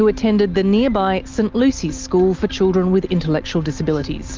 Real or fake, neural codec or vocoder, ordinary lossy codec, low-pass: real; none; Opus, 32 kbps; 7.2 kHz